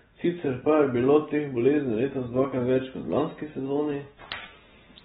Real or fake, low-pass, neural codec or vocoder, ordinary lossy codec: real; 10.8 kHz; none; AAC, 16 kbps